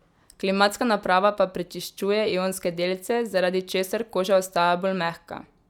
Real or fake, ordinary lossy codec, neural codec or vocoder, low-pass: real; none; none; 19.8 kHz